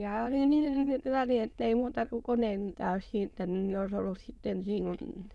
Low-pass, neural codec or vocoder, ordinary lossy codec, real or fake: none; autoencoder, 22.05 kHz, a latent of 192 numbers a frame, VITS, trained on many speakers; none; fake